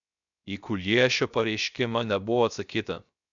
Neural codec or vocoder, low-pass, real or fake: codec, 16 kHz, 0.3 kbps, FocalCodec; 7.2 kHz; fake